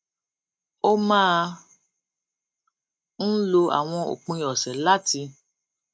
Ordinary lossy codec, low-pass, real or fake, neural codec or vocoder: none; none; real; none